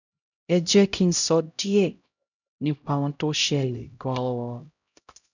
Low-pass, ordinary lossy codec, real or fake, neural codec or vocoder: 7.2 kHz; none; fake; codec, 16 kHz, 0.5 kbps, X-Codec, HuBERT features, trained on LibriSpeech